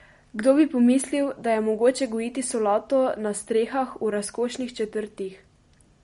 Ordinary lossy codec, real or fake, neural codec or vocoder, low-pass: MP3, 48 kbps; real; none; 10.8 kHz